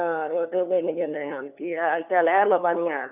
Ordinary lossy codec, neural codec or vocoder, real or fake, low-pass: none; codec, 16 kHz, 2 kbps, FunCodec, trained on LibriTTS, 25 frames a second; fake; 3.6 kHz